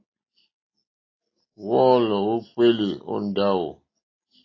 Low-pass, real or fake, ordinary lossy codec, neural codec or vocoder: 7.2 kHz; real; AAC, 32 kbps; none